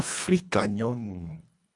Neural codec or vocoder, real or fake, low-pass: codec, 24 kHz, 1.5 kbps, HILCodec; fake; 10.8 kHz